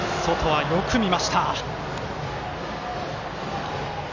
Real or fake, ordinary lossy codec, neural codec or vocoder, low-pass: real; none; none; 7.2 kHz